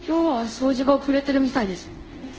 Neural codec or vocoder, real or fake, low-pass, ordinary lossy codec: codec, 24 kHz, 0.5 kbps, DualCodec; fake; 7.2 kHz; Opus, 16 kbps